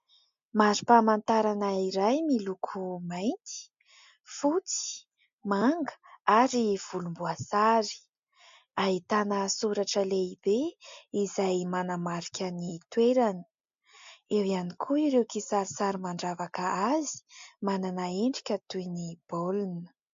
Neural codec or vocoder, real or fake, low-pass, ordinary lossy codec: none; real; 7.2 kHz; MP3, 48 kbps